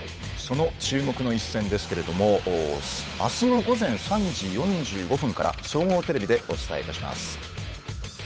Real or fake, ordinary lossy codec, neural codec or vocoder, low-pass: fake; none; codec, 16 kHz, 8 kbps, FunCodec, trained on Chinese and English, 25 frames a second; none